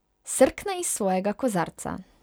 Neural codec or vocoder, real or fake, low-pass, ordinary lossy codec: none; real; none; none